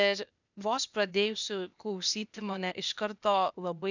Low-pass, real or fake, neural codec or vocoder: 7.2 kHz; fake; codec, 16 kHz, 0.8 kbps, ZipCodec